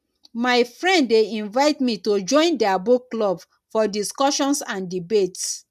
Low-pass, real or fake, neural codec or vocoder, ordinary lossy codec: 14.4 kHz; real; none; none